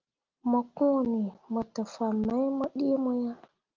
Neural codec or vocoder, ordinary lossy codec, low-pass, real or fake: none; Opus, 32 kbps; 7.2 kHz; real